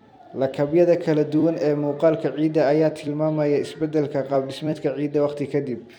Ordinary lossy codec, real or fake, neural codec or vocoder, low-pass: none; fake; vocoder, 44.1 kHz, 128 mel bands every 256 samples, BigVGAN v2; 19.8 kHz